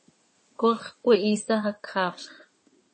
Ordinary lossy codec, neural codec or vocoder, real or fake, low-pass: MP3, 32 kbps; codec, 16 kHz in and 24 kHz out, 2.2 kbps, FireRedTTS-2 codec; fake; 9.9 kHz